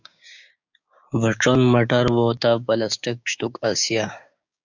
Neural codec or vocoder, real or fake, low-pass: codec, 16 kHz, 6 kbps, DAC; fake; 7.2 kHz